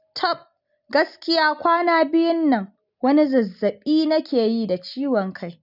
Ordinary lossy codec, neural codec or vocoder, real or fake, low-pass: none; none; real; 5.4 kHz